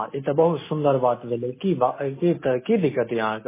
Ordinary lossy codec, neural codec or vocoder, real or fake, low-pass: MP3, 16 kbps; none; real; 3.6 kHz